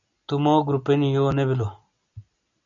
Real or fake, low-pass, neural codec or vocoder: real; 7.2 kHz; none